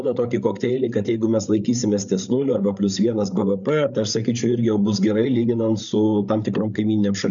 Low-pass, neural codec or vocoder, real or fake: 7.2 kHz; codec, 16 kHz, 8 kbps, FreqCodec, larger model; fake